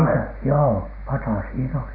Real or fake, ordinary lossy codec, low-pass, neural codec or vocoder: real; none; 5.4 kHz; none